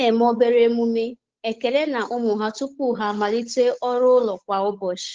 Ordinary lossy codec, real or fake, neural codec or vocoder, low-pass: Opus, 16 kbps; fake; codec, 16 kHz, 8 kbps, FunCodec, trained on Chinese and English, 25 frames a second; 7.2 kHz